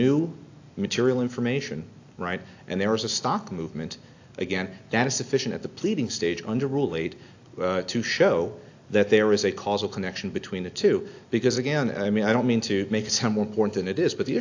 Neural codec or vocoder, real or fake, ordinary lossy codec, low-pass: none; real; AAC, 48 kbps; 7.2 kHz